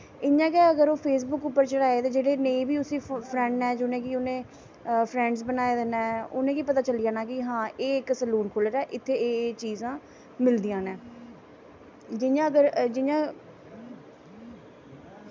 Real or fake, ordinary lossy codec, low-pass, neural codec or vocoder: real; none; none; none